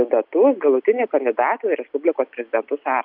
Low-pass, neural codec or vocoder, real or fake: 5.4 kHz; none; real